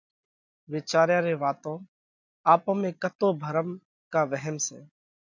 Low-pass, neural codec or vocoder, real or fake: 7.2 kHz; none; real